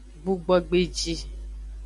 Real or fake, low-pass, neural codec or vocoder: real; 10.8 kHz; none